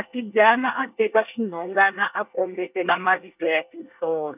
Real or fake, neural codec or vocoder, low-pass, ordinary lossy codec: fake; codec, 24 kHz, 1 kbps, SNAC; 3.6 kHz; none